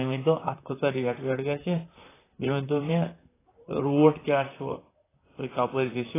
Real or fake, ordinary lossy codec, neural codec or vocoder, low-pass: fake; AAC, 16 kbps; vocoder, 44.1 kHz, 128 mel bands, Pupu-Vocoder; 3.6 kHz